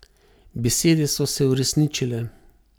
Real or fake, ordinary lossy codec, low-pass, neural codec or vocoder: real; none; none; none